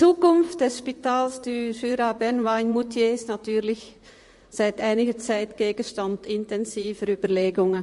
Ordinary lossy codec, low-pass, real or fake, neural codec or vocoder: MP3, 48 kbps; 14.4 kHz; fake; vocoder, 44.1 kHz, 128 mel bands, Pupu-Vocoder